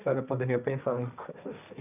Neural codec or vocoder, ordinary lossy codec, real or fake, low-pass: codec, 16 kHz, 1.1 kbps, Voila-Tokenizer; none; fake; 3.6 kHz